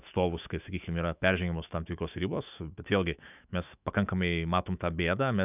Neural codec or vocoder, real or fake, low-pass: autoencoder, 48 kHz, 128 numbers a frame, DAC-VAE, trained on Japanese speech; fake; 3.6 kHz